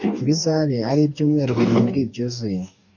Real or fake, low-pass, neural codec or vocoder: fake; 7.2 kHz; codec, 44.1 kHz, 2.6 kbps, DAC